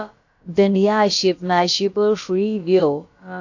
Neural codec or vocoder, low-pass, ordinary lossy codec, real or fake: codec, 16 kHz, about 1 kbps, DyCAST, with the encoder's durations; 7.2 kHz; AAC, 48 kbps; fake